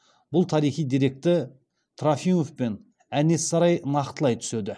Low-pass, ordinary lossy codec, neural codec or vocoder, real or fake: none; none; none; real